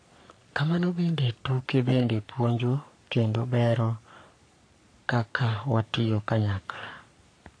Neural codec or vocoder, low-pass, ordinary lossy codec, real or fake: codec, 44.1 kHz, 3.4 kbps, Pupu-Codec; 9.9 kHz; AAC, 48 kbps; fake